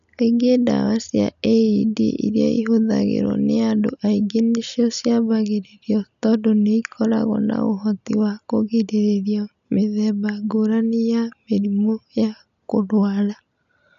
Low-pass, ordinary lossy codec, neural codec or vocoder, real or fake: 7.2 kHz; none; none; real